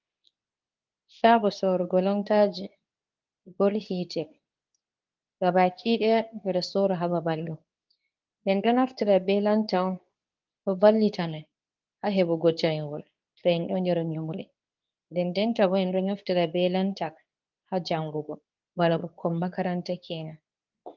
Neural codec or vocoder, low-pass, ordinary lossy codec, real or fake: codec, 24 kHz, 0.9 kbps, WavTokenizer, medium speech release version 2; 7.2 kHz; Opus, 24 kbps; fake